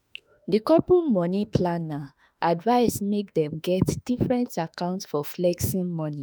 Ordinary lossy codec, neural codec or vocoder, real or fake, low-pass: none; autoencoder, 48 kHz, 32 numbers a frame, DAC-VAE, trained on Japanese speech; fake; none